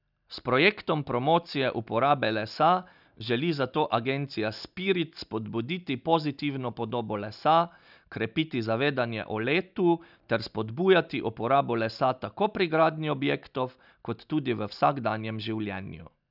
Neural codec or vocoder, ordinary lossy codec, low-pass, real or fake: none; none; 5.4 kHz; real